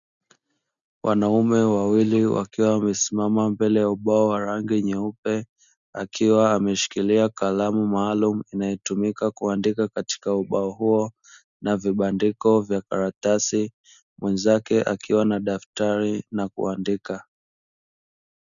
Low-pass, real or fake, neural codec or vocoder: 7.2 kHz; real; none